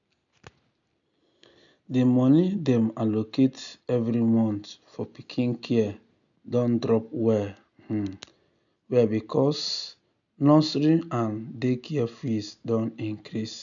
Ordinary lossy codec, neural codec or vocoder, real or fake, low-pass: none; none; real; 7.2 kHz